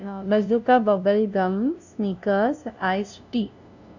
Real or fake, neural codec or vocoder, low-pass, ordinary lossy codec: fake; codec, 16 kHz, 0.5 kbps, FunCodec, trained on LibriTTS, 25 frames a second; 7.2 kHz; none